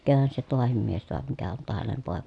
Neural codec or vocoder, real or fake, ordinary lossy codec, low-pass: none; real; none; 10.8 kHz